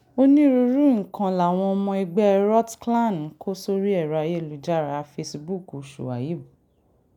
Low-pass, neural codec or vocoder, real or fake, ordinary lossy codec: 19.8 kHz; none; real; none